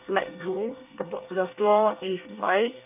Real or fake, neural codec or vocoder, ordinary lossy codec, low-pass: fake; codec, 24 kHz, 1 kbps, SNAC; none; 3.6 kHz